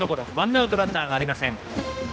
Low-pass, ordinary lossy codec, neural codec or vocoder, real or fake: none; none; codec, 16 kHz, 1 kbps, X-Codec, HuBERT features, trained on general audio; fake